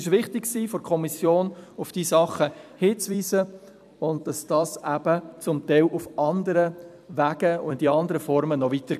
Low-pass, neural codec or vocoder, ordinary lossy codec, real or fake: 14.4 kHz; vocoder, 44.1 kHz, 128 mel bands every 256 samples, BigVGAN v2; none; fake